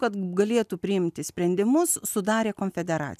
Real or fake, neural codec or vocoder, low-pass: real; none; 14.4 kHz